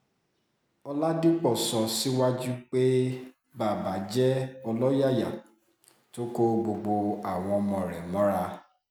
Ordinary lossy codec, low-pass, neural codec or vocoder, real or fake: none; none; none; real